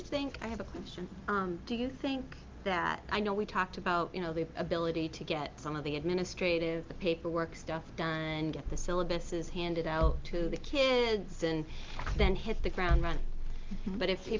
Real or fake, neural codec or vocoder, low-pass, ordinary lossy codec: real; none; 7.2 kHz; Opus, 32 kbps